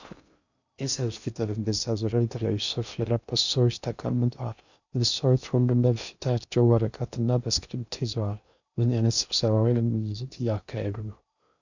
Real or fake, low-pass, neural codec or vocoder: fake; 7.2 kHz; codec, 16 kHz in and 24 kHz out, 0.6 kbps, FocalCodec, streaming, 2048 codes